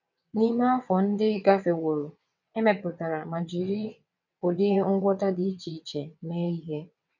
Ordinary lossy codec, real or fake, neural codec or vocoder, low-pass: none; fake; vocoder, 22.05 kHz, 80 mel bands, WaveNeXt; 7.2 kHz